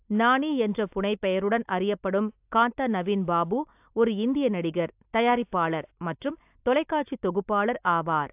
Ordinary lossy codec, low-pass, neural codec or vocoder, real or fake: AAC, 32 kbps; 3.6 kHz; none; real